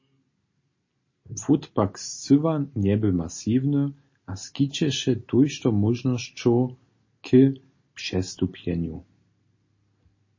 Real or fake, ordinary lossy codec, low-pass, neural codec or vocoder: real; MP3, 32 kbps; 7.2 kHz; none